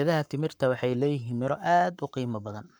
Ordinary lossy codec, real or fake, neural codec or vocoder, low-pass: none; fake; codec, 44.1 kHz, 7.8 kbps, Pupu-Codec; none